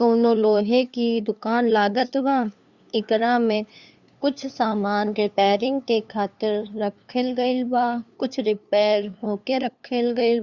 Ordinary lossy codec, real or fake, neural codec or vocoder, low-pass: Opus, 64 kbps; fake; codec, 16 kHz, 2 kbps, FunCodec, trained on LibriTTS, 25 frames a second; 7.2 kHz